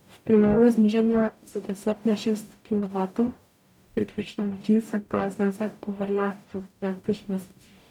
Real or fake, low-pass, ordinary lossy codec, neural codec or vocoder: fake; 19.8 kHz; none; codec, 44.1 kHz, 0.9 kbps, DAC